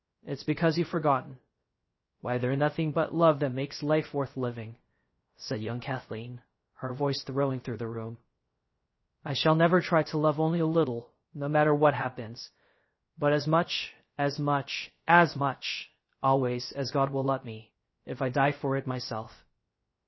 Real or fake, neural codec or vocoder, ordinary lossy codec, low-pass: fake; codec, 16 kHz, 0.3 kbps, FocalCodec; MP3, 24 kbps; 7.2 kHz